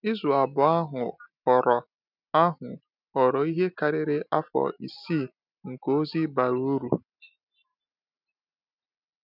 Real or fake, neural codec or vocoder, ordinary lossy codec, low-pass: real; none; none; 5.4 kHz